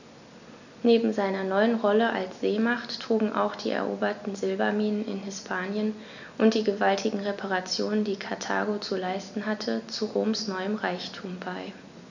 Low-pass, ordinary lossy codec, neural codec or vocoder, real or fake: 7.2 kHz; none; none; real